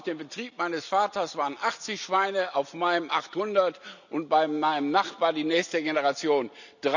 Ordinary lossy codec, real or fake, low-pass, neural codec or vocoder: none; real; 7.2 kHz; none